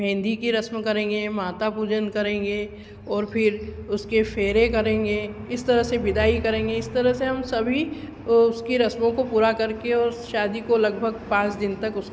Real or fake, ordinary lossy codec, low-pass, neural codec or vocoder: real; none; none; none